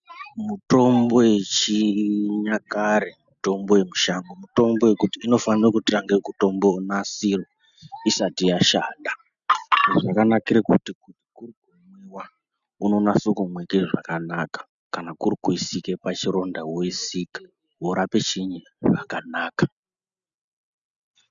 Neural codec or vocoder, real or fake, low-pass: none; real; 7.2 kHz